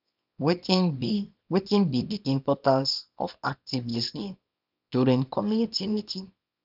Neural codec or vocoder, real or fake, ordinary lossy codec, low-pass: codec, 24 kHz, 0.9 kbps, WavTokenizer, small release; fake; none; 5.4 kHz